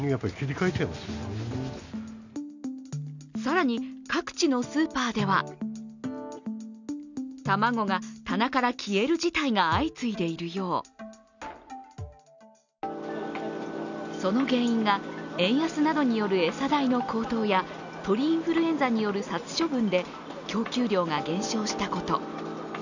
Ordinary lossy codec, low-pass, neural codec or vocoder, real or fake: none; 7.2 kHz; none; real